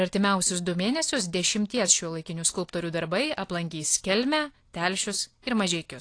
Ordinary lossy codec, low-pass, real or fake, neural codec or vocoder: AAC, 48 kbps; 9.9 kHz; real; none